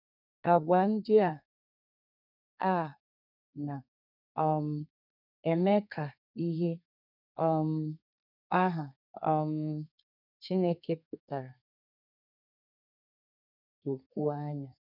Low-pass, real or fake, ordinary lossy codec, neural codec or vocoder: 5.4 kHz; fake; none; codec, 32 kHz, 1.9 kbps, SNAC